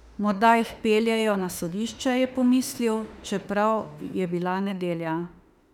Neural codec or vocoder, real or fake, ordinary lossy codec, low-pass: autoencoder, 48 kHz, 32 numbers a frame, DAC-VAE, trained on Japanese speech; fake; none; 19.8 kHz